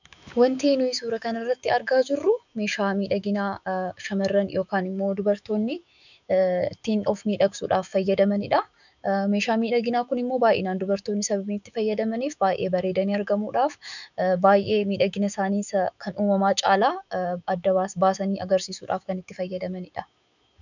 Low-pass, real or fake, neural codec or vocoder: 7.2 kHz; fake; autoencoder, 48 kHz, 128 numbers a frame, DAC-VAE, trained on Japanese speech